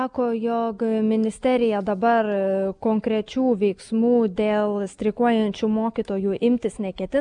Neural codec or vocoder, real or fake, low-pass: none; real; 9.9 kHz